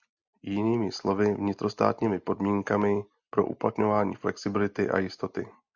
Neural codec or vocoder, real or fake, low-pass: none; real; 7.2 kHz